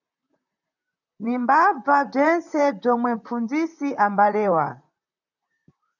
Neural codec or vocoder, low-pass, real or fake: vocoder, 44.1 kHz, 128 mel bands, Pupu-Vocoder; 7.2 kHz; fake